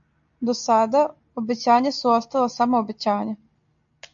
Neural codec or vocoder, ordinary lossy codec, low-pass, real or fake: none; AAC, 64 kbps; 7.2 kHz; real